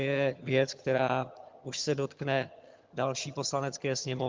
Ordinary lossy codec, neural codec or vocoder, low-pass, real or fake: Opus, 24 kbps; vocoder, 22.05 kHz, 80 mel bands, HiFi-GAN; 7.2 kHz; fake